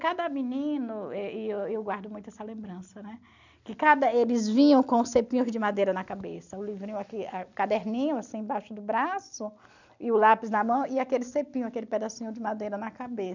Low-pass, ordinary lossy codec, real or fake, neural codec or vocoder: 7.2 kHz; none; real; none